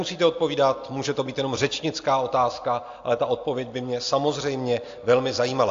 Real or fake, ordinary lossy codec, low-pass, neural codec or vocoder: real; AAC, 48 kbps; 7.2 kHz; none